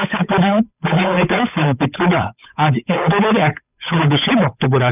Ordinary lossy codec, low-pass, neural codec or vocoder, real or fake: none; 3.6 kHz; codec, 16 kHz, 8 kbps, FunCodec, trained on Chinese and English, 25 frames a second; fake